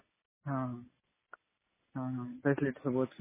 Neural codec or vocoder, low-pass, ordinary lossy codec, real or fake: none; 3.6 kHz; MP3, 16 kbps; real